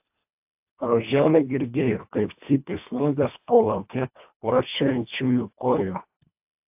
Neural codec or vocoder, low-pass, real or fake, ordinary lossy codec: codec, 24 kHz, 1.5 kbps, HILCodec; 3.6 kHz; fake; AAC, 32 kbps